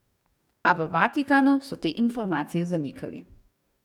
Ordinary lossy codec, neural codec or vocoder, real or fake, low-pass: none; codec, 44.1 kHz, 2.6 kbps, DAC; fake; 19.8 kHz